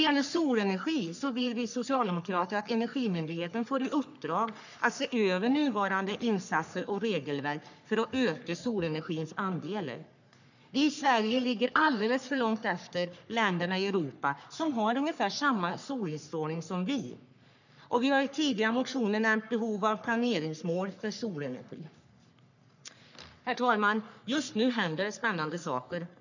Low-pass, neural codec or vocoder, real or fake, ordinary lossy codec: 7.2 kHz; codec, 44.1 kHz, 3.4 kbps, Pupu-Codec; fake; none